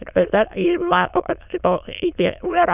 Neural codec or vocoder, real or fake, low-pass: autoencoder, 22.05 kHz, a latent of 192 numbers a frame, VITS, trained on many speakers; fake; 3.6 kHz